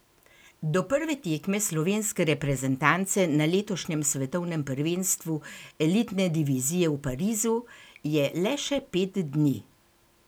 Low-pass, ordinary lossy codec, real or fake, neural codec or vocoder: none; none; real; none